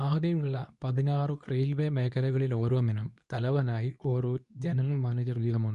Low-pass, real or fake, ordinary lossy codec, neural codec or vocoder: 10.8 kHz; fake; none; codec, 24 kHz, 0.9 kbps, WavTokenizer, medium speech release version 2